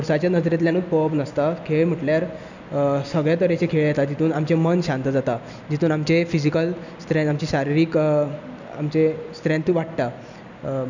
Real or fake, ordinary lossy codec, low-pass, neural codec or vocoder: real; none; 7.2 kHz; none